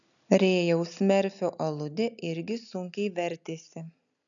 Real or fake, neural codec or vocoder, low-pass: real; none; 7.2 kHz